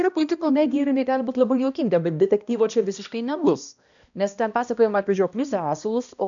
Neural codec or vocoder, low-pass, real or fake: codec, 16 kHz, 1 kbps, X-Codec, HuBERT features, trained on balanced general audio; 7.2 kHz; fake